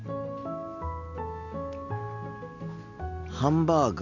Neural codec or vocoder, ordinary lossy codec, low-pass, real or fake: none; none; 7.2 kHz; real